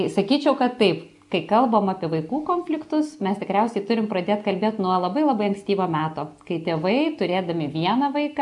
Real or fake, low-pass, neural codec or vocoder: real; 10.8 kHz; none